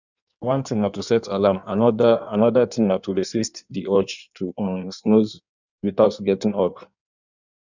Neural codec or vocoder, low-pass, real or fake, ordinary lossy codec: codec, 16 kHz in and 24 kHz out, 1.1 kbps, FireRedTTS-2 codec; 7.2 kHz; fake; none